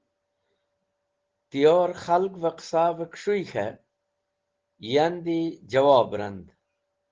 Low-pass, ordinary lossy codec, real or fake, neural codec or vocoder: 7.2 kHz; Opus, 16 kbps; real; none